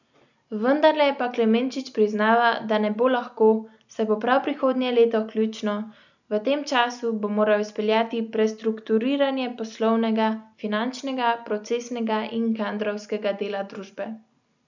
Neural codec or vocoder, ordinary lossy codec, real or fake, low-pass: none; none; real; 7.2 kHz